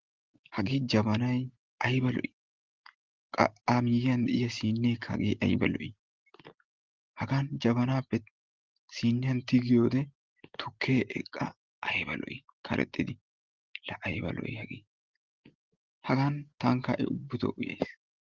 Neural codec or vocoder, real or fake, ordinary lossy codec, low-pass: none; real; Opus, 16 kbps; 7.2 kHz